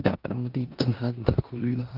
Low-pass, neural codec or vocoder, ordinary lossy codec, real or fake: 5.4 kHz; codec, 16 kHz in and 24 kHz out, 0.9 kbps, LongCat-Audio-Codec, four codebook decoder; Opus, 24 kbps; fake